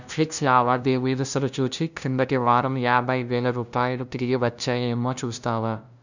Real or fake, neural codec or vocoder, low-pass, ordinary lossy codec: fake; codec, 16 kHz, 0.5 kbps, FunCodec, trained on LibriTTS, 25 frames a second; 7.2 kHz; none